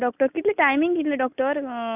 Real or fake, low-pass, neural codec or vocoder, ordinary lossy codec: real; 3.6 kHz; none; none